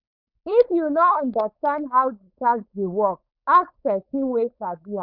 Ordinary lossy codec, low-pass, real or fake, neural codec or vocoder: none; 5.4 kHz; fake; codec, 16 kHz, 4.8 kbps, FACodec